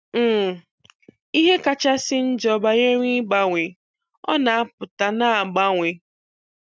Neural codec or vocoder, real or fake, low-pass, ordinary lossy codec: none; real; none; none